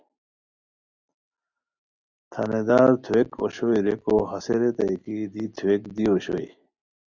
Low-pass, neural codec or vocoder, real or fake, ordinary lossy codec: 7.2 kHz; none; real; Opus, 64 kbps